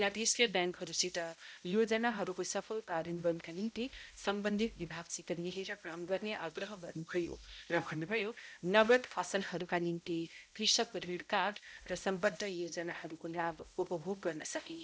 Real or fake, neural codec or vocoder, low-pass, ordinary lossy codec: fake; codec, 16 kHz, 0.5 kbps, X-Codec, HuBERT features, trained on balanced general audio; none; none